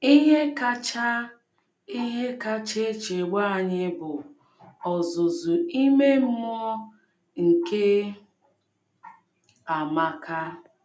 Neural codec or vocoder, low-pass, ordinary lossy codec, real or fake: none; none; none; real